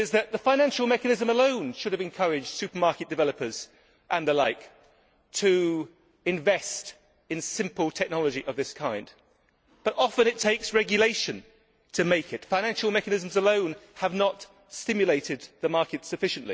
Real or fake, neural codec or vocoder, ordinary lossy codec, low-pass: real; none; none; none